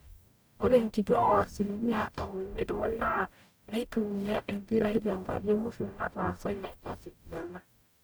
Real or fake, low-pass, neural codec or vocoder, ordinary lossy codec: fake; none; codec, 44.1 kHz, 0.9 kbps, DAC; none